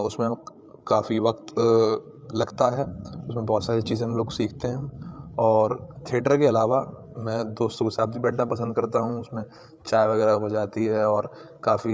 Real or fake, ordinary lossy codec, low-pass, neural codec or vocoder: fake; none; none; codec, 16 kHz, 8 kbps, FreqCodec, larger model